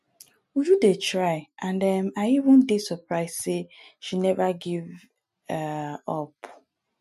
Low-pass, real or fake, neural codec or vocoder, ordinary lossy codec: 14.4 kHz; real; none; MP3, 64 kbps